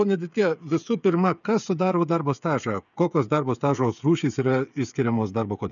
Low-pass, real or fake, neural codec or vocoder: 7.2 kHz; fake; codec, 16 kHz, 8 kbps, FreqCodec, smaller model